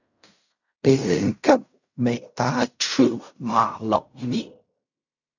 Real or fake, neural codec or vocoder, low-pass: fake; codec, 16 kHz in and 24 kHz out, 0.4 kbps, LongCat-Audio-Codec, fine tuned four codebook decoder; 7.2 kHz